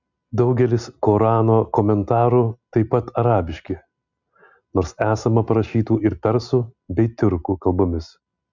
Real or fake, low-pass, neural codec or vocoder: real; 7.2 kHz; none